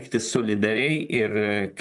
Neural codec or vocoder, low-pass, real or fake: vocoder, 44.1 kHz, 128 mel bands, Pupu-Vocoder; 10.8 kHz; fake